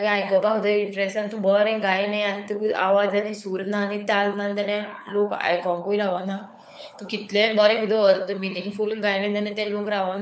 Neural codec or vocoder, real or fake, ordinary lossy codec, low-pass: codec, 16 kHz, 4 kbps, FunCodec, trained on LibriTTS, 50 frames a second; fake; none; none